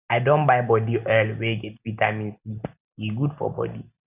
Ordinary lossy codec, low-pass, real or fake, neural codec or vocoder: none; 3.6 kHz; real; none